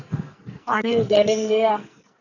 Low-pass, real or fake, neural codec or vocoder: 7.2 kHz; fake; codec, 44.1 kHz, 2.6 kbps, SNAC